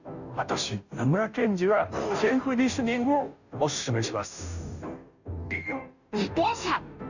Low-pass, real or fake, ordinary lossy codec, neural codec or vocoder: 7.2 kHz; fake; none; codec, 16 kHz, 0.5 kbps, FunCodec, trained on Chinese and English, 25 frames a second